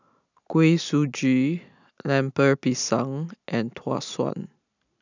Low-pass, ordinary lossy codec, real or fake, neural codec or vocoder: 7.2 kHz; none; real; none